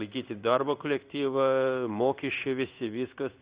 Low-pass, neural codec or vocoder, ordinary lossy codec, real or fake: 3.6 kHz; codec, 16 kHz in and 24 kHz out, 1 kbps, XY-Tokenizer; Opus, 64 kbps; fake